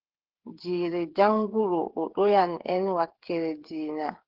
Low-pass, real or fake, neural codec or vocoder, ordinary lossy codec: 5.4 kHz; fake; codec, 16 kHz, 8 kbps, FreqCodec, smaller model; Opus, 16 kbps